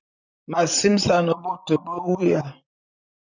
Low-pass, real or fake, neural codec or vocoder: 7.2 kHz; fake; vocoder, 44.1 kHz, 128 mel bands, Pupu-Vocoder